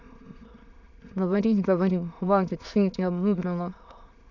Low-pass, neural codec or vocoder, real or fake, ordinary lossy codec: 7.2 kHz; autoencoder, 22.05 kHz, a latent of 192 numbers a frame, VITS, trained on many speakers; fake; none